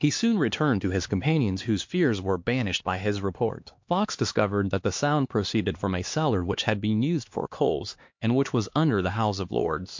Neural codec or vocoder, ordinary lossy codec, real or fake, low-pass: codec, 16 kHz, 2 kbps, X-Codec, HuBERT features, trained on LibriSpeech; MP3, 48 kbps; fake; 7.2 kHz